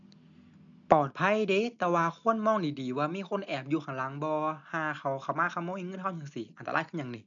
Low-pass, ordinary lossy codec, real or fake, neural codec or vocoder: 7.2 kHz; none; real; none